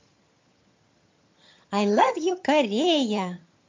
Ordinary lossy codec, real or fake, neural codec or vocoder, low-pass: MP3, 48 kbps; fake; vocoder, 22.05 kHz, 80 mel bands, HiFi-GAN; 7.2 kHz